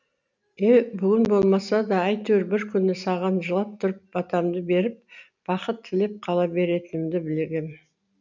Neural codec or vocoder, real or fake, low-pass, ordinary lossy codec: none; real; 7.2 kHz; none